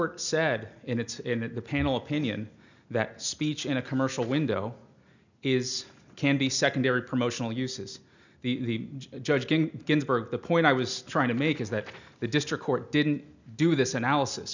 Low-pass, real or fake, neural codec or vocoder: 7.2 kHz; real; none